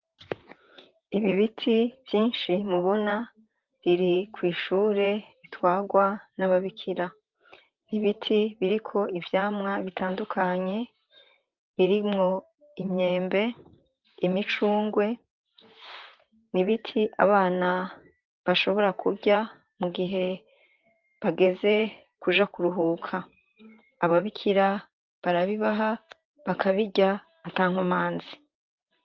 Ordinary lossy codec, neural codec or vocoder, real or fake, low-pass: Opus, 32 kbps; vocoder, 44.1 kHz, 128 mel bands, Pupu-Vocoder; fake; 7.2 kHz